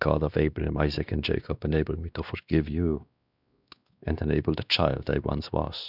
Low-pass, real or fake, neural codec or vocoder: 5.4 kHz; fake; codec, 16 kHz, 2 kbps, X-Codec, WavLM features, trained on Multilingual LibriSpeech